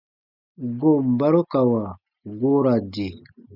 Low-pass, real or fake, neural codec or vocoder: 5.4 kHz; real; none